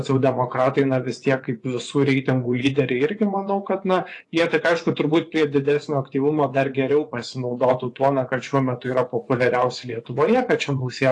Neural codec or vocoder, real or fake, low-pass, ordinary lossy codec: vocoder, 24 kHz, 100 mel bands, Vocos; fake; 10.8 kHz; AAC, 48 kbps